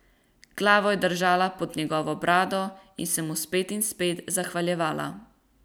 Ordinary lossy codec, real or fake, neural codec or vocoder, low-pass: none; real; none; none